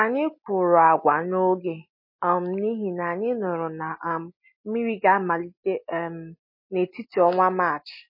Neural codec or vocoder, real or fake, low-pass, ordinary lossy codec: none; real; 5.4 kHz; MP3, 24 kbps